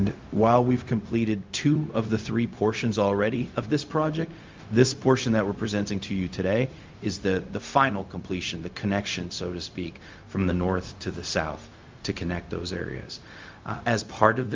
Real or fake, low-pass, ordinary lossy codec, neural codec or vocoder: fake; 7.2 kHz; Opus, 32 kbps; codec, 16 kHz, 0.4 kbps, LongCat-Audio-Codec